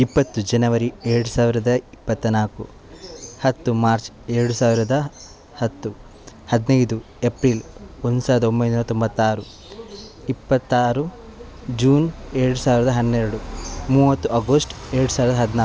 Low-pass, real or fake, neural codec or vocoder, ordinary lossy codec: none; real; none; none